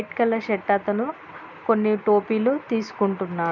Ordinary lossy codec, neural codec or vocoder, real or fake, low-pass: none; none; real; 7.2 kHz